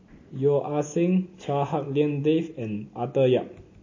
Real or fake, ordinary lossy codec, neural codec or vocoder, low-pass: real; MP3, 32 kbps; none; 7.2 kHz